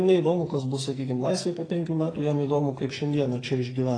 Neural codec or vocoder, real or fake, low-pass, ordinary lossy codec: codec, 44.1 kHz, 2.6 kbps, SNAC; fake; 9.9 kHz; AAC, 32 kbps